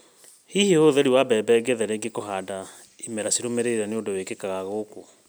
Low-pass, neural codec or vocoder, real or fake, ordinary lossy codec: none; none; real; none